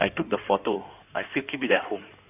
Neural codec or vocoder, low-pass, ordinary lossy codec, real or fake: codec, 16 kHz in and 24 kHz out, 2.2 kbps, FireRedTTS-2 codec; 3.6 kHz; none; fake